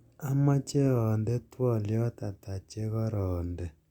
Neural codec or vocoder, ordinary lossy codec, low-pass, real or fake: none; Opus, 64 kbps; 19.8 kHz; real